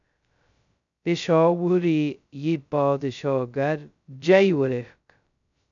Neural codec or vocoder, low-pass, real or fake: codec, 16 kHz, 0.2 kbps, FocalCodec; 7.2 kHz; fake